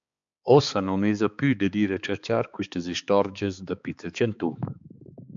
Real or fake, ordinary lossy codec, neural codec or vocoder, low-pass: fake; MP3, 64 kbps; codec, 16 kHz, 4 kbps, X-Codec, HuBERT features, trained on balanced general audio; 7.2 kHz